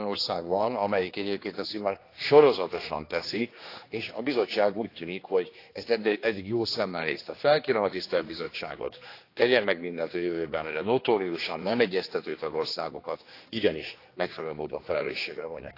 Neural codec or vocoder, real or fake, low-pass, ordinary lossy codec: codec, 16 kHz, 2 kbps, X-Codec, HuBERT features, trained on general audio; fake; 5.4 kHz; AAC, 32 kbps